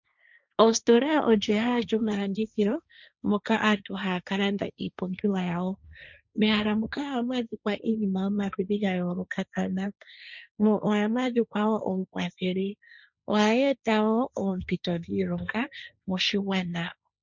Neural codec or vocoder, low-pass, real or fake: codec, 16 kHz, 1.1 kbps, Voila-Tokenizer; 7.2 kHz; fake